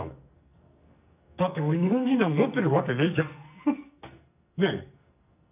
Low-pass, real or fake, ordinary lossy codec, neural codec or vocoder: 3.6 kHz; fake; none; codec, 44.1 kHz, 2.6 kbps, SNAC